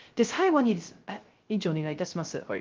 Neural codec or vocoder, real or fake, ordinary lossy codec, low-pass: codec, 16 kHz, 0.3 kbps, FocalCodec; fake; Opus, 32 kbps; 7.2 kHz